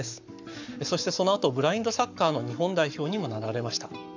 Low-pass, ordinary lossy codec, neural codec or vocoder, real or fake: 7.2 kHz; none; codec, 44.1 kHz, 7.8 kbps, Pupu-Codec; fake